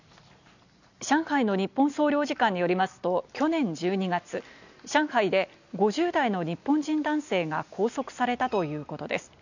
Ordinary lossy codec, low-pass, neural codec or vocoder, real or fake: none; 7.2 kHz; none; real